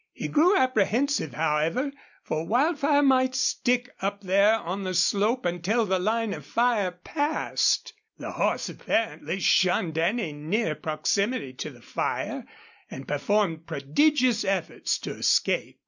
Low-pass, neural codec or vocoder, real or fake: 7.2 kHz; none; real